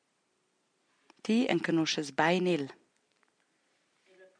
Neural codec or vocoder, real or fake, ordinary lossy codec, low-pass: none; real; MP3, 96 kbps; 9.9 kHz